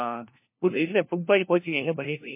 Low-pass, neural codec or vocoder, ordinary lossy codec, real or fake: 3.6 kHz; codec, 16 kHz, 1 kbps, FunCodec, trained on LibriTTS, 50 frames a second; MP3, 24 kbps; fake